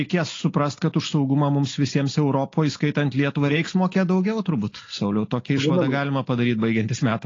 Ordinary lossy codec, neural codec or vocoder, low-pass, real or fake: AAC, 32 kbps; none; 7.2 kHz; real